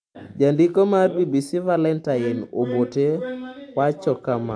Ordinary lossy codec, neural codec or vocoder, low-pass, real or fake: AAC, 64 kbps; none; 9.9 kHz; real